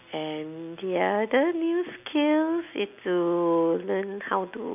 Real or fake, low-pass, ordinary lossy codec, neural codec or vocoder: real; 3.6 kHz; none; none